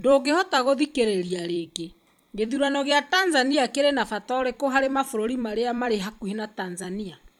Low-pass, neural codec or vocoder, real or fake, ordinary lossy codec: 19.8 kHz; vocoder, 44.1 kHz, 128 mel bands every 256 samples, BigVGAN v2; fake; none